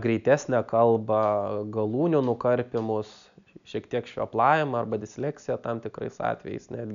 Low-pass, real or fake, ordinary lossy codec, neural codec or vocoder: 7.2 kHz; real; MP3, 96 kbps; none